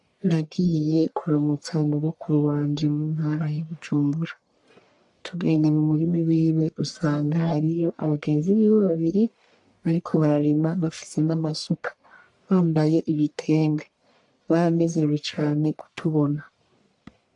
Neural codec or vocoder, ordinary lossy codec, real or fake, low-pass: codec, 44.1 kHz, 1.7 kbps, Pupu-Codec; AAC, 64 kbps; fake; 10.8 kHz